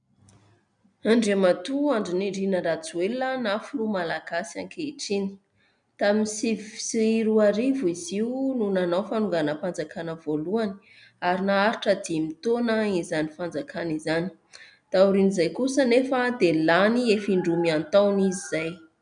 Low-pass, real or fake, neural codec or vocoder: 9.9 kHz; real; none